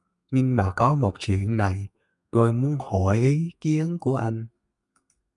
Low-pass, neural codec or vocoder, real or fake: 10.8 kHz; codec, 32 kHz, 1.9 kbps, SNAC; fake